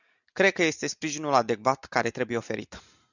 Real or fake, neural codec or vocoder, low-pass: real; none; 7.2 kHz